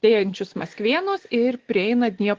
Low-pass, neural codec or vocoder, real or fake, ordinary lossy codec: 7.2 kHz; none; real; Opus, 16 kbps